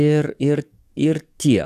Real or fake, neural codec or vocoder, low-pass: fake; codec, 44.1 kHz, 7.8 kbps, DAC; 14.4 kHz